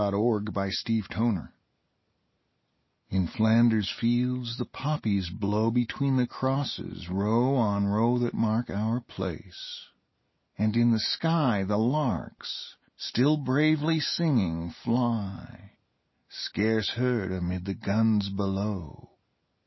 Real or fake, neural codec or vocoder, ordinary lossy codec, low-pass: real; none; MP3, 24 kbps; 7.2 kHz